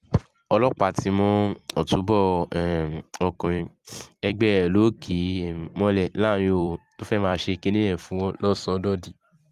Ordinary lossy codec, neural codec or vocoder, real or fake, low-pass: Opus, 32 kbps; vocoder, 44.1 kHz, 128 mel bands every 256 samples, BigVGAN v2; fake; 14.4 kHz